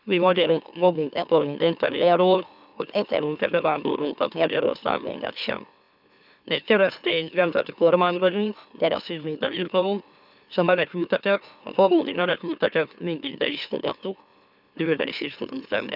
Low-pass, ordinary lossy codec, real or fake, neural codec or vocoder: 5.4 kHz; none; fake; autoencoder, 44.1 kHz, a latent of 192 numbers a frame, MeloTTS